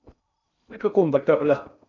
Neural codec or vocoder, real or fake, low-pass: codec, 16 kHz in and 24 kHz out, 0.6 kbps, FocalCodec, streaming, 2048 codes; fake; 7.2 kHz